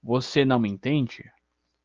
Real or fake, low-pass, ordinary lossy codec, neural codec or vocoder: real; 7.2 kHz; Opus, 24 kbps; none